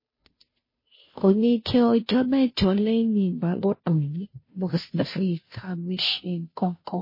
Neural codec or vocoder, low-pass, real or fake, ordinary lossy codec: codec, 16 kHz, 0.5 kbps, FunCodec, trained on Chinese and English, 25 frames a second; 5.4 kHz; fake; MP3, 24 kbps